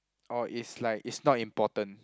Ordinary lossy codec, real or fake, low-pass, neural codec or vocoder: none; real; none; none